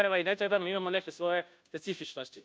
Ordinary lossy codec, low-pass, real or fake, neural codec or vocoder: none; none; fake; codec, 16 kHz, 0.5 kbps, FunCodec, trained on Chinese and English, 25 frames a second